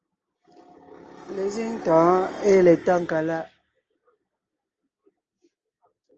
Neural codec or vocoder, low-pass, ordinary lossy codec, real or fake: none; 7.2 kHz; Opus, 24 kbps; real